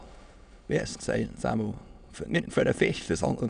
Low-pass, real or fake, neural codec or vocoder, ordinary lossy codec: 9.9 kHz; fake; autoencoder, 22.05 kHz, a latent of 192 numbers a frame, VITS, trained on many speakers; none